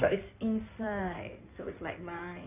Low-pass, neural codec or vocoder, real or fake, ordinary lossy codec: 3.6 kHz; codec, 16 kHz in and 24 kHz out, 2.2 kbps, FireRedTTS-2 codec; fake; none